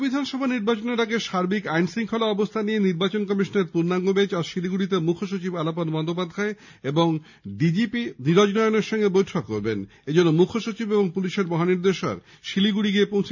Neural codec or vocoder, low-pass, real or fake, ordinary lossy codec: none; 7.2 kHz; real; none